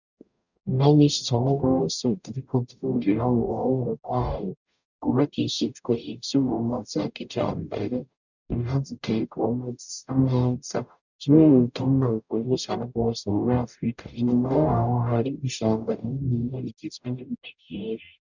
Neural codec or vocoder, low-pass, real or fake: codec, 44.1 kHz, 0.9 kbps, DAC; 7.2 kHz; fake